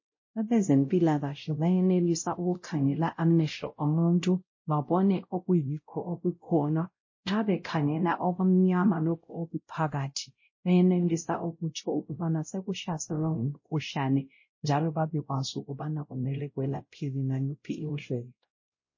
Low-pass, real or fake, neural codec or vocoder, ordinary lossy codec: 7.2 kHz; fake; codec, 16 kHz, 0.5 kbps, X-Codec, WavLM features, trained on Multilingual LibriSpeech; MP3, 32 kbps